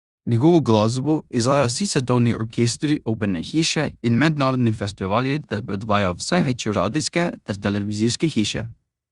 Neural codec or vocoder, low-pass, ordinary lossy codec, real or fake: codec, 16 kHz in and 24 kHz out, 0.9 kbps, LongCat-Audio-Codec, four codebook decoder; 10.8 kHz; Opus, 64 kbps; fake